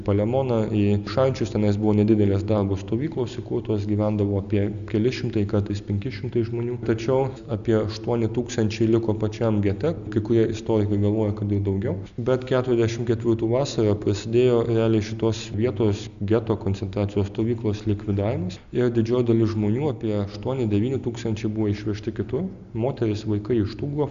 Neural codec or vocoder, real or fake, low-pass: none; real; 7.2 kHz